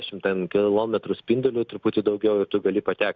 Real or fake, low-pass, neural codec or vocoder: real; 7.2 kHz; none